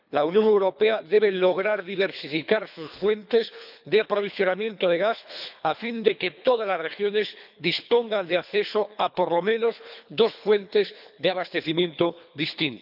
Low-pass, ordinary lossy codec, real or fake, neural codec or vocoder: 5.4 kHz; none; fake; codec, 24 kHz, 3 kbps, HILCodec